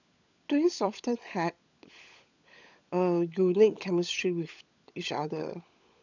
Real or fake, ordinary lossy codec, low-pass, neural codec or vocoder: fake; none; 7.2 kHz; codec, 16 kHz, 16 kbps, FunCodec, trained on LibriTTS, 50 frames a second